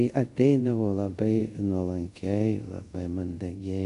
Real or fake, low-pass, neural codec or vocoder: fake; 10.8 kHz; codec, 24 kHz, 0.5 kbps, DualCodec